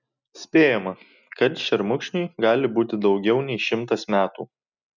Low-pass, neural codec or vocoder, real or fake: 7.2 kHz; none; real